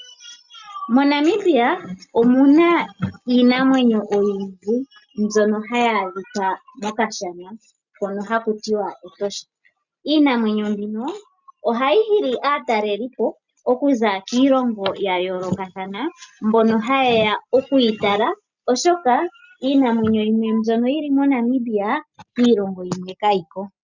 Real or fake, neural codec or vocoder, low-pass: real; none; 7.2 kHz